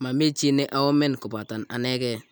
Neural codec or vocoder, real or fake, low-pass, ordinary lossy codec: none; real; none; none